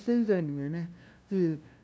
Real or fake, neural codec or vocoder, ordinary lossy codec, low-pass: fake; codec, 16 kHz, 0.5 kbps, FunCodec, trained on LibriTTS, 25 frames a second; none; none